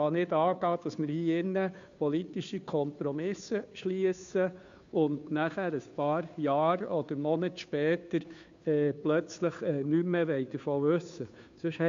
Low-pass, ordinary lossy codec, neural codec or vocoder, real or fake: 7.2 kHz; MP3, 64 kbps; codec, 16 kHz, 2 kbps, FunCodec, trained on Chinese and English, 25 frames a second; fake